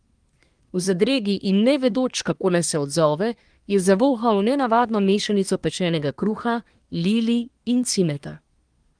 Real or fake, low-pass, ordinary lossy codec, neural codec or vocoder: fake; 9.9 kHz; Opus, 24 kbps; codec, 24 kHz, 1 kbps, SNAC